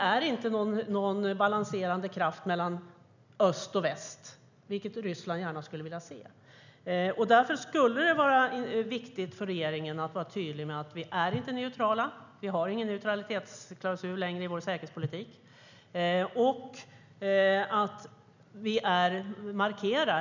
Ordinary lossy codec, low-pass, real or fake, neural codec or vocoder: none; 7.2 kHz; real; none